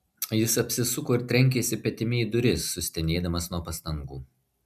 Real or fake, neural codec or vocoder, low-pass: real; none; 14.4 kHz